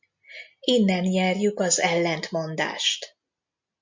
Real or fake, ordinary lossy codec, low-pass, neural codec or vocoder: real; MP3, 48 kbps; 7.2 kHz; none